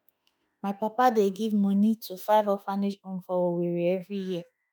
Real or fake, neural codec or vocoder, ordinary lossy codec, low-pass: fake; autoencoder, 48 kHz, 32 numbers a frame, DAC-VAE, trained on Japanese speech; none; none